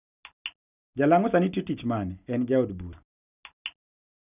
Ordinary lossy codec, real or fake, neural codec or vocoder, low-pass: none; real; none; 3.6 kHz